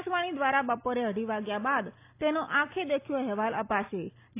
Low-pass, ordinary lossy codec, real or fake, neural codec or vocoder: 3.6 kHz; AAC, 24 kbps; real; none